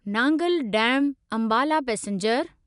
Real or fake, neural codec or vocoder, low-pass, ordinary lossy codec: real; none; 10.8 kHz; none